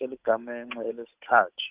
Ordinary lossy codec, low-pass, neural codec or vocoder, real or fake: Opus, 32 kbps; 3.6 kHz; codec, 16 kHz, 8 kbps, FunCodec, trained on Chinese and English, 25 frames a second; fake